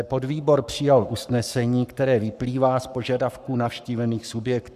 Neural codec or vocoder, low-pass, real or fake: codec, 44.1 kHz, 7.8 kbps, Pupu-Codec; 14.4 kHz; fake